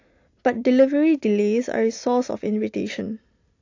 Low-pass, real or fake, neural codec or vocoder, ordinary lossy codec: 7.2 kHz; real; none; AAC, 48 kbps